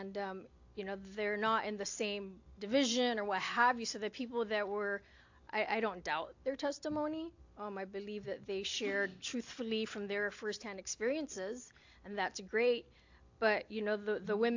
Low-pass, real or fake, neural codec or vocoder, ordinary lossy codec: 7.2 kHz; real; none; AAC, 48 kbps